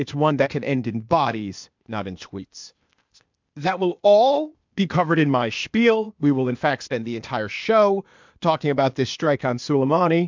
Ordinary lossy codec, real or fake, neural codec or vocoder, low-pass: MP3, 64 kbps; fake; codec, 16 kHz, 0.8 kbps, ZipCodec; 7.2 kHz